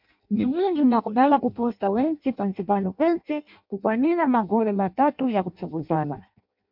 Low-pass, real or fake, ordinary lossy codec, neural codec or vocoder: 5.4 kHz; fake; MP3, 48 kbps; codec, 16 kHz in and 24 kHz out, 0.6 kbps, FireRedTTS-2 codec